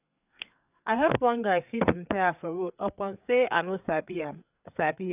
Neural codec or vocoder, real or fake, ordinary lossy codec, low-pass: codec, 16 kHz, 4 kbps, FreqCodec, larger model; fake; none; 3.6 kHz